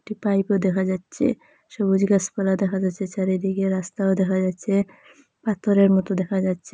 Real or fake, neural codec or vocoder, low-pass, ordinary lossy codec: real; none; none; none